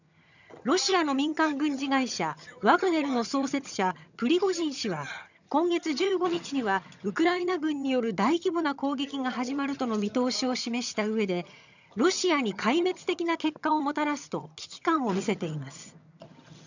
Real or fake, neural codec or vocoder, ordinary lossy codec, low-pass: fake; vocoder, 22.05 kHz, 80 mel bands, HiFi-GAN; none; 7.2 kHz